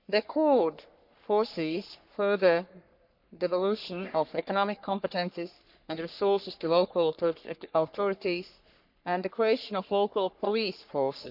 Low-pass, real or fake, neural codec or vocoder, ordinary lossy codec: 5.4 kHz; fake; codec, 44.1 kHz, 3.4 kbps, Pupu-Codec; none